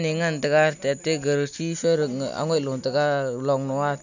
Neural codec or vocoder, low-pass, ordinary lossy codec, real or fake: none; 7.2 kHz; none; real